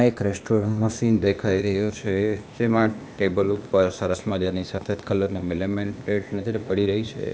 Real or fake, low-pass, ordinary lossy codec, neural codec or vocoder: fake; none; none; codec, 16 kHz, 0.8 kbps, ZipCodec